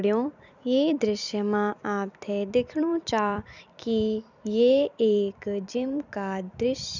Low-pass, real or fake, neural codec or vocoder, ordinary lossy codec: 7.2 kHz; fake; vocoder, 44.1 kHz, 128 mel bands every 256 samples, BigVGAN v2; none